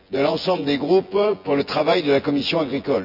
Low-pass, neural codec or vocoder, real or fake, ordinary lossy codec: 5.4 kHz; vocoder, 24 kHz, 100 mel bands, Vocos; fake; none